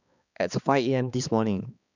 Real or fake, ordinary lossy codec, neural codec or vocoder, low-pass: fake; none; codec, 16 kHz, 2 kbps, X-Codec, HuBERT features, trained on balanced general audio; 7.2 kHz